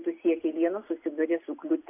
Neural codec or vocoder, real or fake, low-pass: none; real; 3.6 kHz